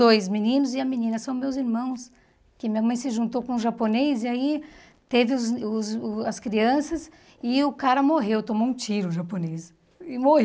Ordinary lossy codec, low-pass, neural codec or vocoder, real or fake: none; none; none; real